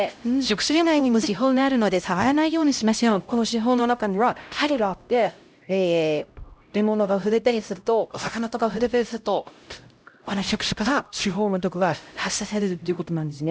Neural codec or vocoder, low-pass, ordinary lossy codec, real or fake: codec, 16 kHz, 0.5 kbps, X-Codec, HuBERT features, trained on LibriSpeech; none; none; fake